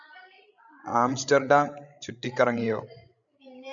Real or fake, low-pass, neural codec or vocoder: fake; 7.2 kHz; codec, 16 kHz, 16 kbps, FreqCodec, larger model